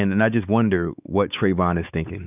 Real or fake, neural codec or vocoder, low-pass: fake; codec, 16 kHz, 4 kbps, X-Codec, WavLM features, trained on Multilingual LibriSpeech; 3.6 kHz